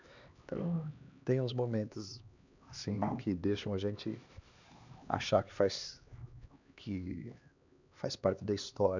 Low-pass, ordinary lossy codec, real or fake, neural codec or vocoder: 7.2 kHz; none; fake; codec, 16 kHz, 2 kbps, X-Codec, HuBERT features, trained on LibriSpeech